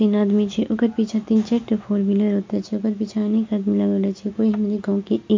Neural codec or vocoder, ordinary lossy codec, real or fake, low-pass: none; MP3, 48 kbps; real; 7.2 kHz